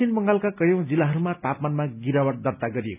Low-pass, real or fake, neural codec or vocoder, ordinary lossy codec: 3.6 kHz; real; none; none